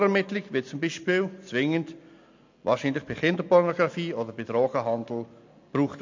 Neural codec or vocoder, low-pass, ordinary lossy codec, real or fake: none; 7.2 kHz; MP3, 48 kbps; real